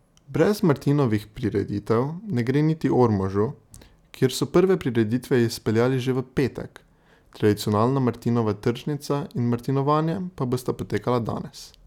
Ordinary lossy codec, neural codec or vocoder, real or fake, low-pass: none; none; real; 19.8 kHz